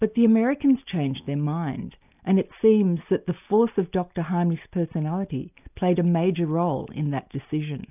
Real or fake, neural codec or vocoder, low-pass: real; none; 3.6 kHz